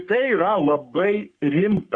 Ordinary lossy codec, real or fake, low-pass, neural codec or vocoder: Opus, 64 kbps; fake; 9.9 kHz; codec, 44.1 kHz, 3.4 kbps, Pupu-Codec